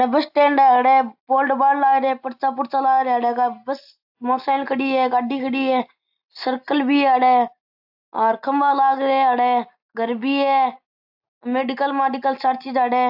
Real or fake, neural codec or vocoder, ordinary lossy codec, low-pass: real; none; AAC, 48 kbps; 5.4 kHz